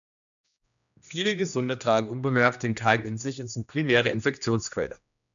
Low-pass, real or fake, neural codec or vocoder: 7.2 kHz; fake; codec, 16 kHz, 1 kbps, X-Codec, HuBERT features, trained on general audio